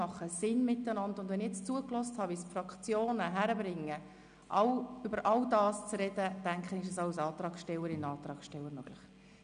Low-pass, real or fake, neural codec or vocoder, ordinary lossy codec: 9.9 kHz; real; none; none